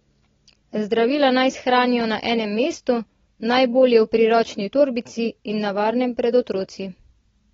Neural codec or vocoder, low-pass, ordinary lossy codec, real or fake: none; 7.2 kHz; AAC, 24 kbps; real